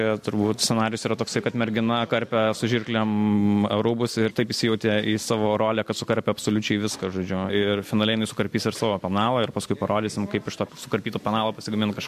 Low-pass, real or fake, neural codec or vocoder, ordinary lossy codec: 14.4 kHz; real; none; MP3, 64 kbps